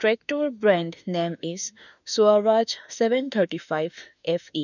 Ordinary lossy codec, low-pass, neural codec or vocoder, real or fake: none; 7.2 kHz; autoencoder, 48 kHz, 32 numbers a frame, DAC-VAE, trained on Japanese speech; fake